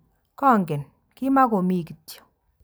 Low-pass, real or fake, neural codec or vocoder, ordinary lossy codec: none; real; none; none